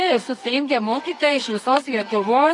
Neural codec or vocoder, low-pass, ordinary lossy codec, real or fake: codec, 24 kHz, 0.9 kbps, WavTokenizer, medium music audio release; 10.8 kHz; AAC, 48 kbps; fake